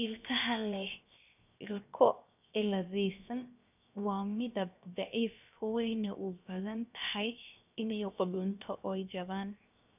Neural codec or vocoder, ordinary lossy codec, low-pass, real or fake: codec, 16 kHz, 0.7 kbps, FocalCodec; none; 3.6 kHz; fake